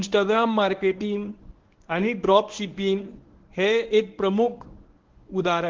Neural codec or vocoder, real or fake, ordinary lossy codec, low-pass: codec, 24 kHz, 0.9 kbps, WavTokenizer, medium speech release version 1; fake; Opus, 16 kbps; 7.2 kHz